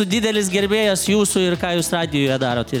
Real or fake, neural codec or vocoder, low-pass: real; none; 19.8 kHz